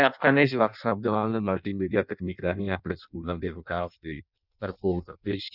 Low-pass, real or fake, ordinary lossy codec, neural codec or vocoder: 5.4 kHz; fake; none; codec, 16 kHz in and 24 kHz out, 0.6 kbps, FireRedTTS-2 codec